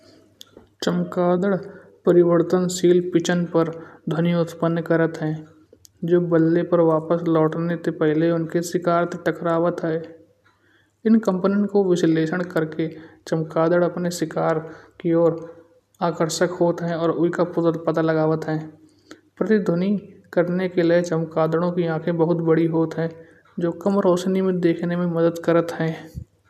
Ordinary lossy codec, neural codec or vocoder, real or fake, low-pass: none; none; real; 14.4 kHz